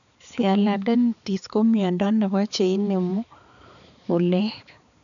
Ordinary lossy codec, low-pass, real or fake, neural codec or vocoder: none; 7.2 kHz; fake; codec, 16 kHz, 4 kbps, X-Codec, HuBERT features, trained on balanced general audio